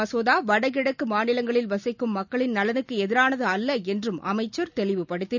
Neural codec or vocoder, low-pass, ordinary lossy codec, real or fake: none; 7.2 kHz; none; real